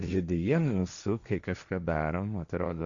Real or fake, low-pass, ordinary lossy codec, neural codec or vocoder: fake; 7.2 kHz; Opus, 64 kbps; codec, 16 kHz, 1.1 kbps, Voila-Tokenizer